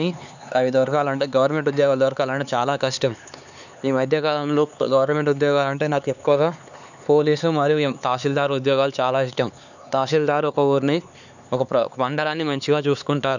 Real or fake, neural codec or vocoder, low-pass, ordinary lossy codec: fake; codec, 16 kHz, 4 kbps, X-Codec, HuBERT features, trained on LibriSpeech; 7.2 kHz; none